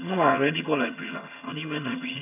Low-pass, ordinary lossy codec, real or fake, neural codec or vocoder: 3.6 kHz; none; fake; vocoder, 22.05 kHz, 80 mel bands, HiFi-GAN